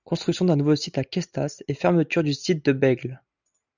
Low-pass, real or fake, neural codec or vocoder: 7.2 kHz; real; none